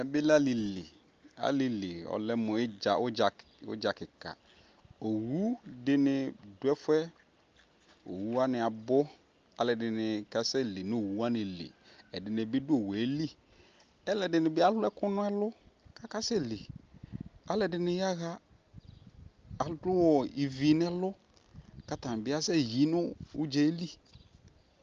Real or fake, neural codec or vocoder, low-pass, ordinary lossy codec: real; none; 7.2 kHz; Opus, 24 kbps